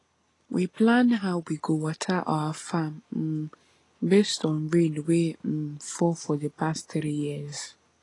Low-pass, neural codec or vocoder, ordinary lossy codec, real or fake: 10.8 kHz; none; AAC, 32 kbps; real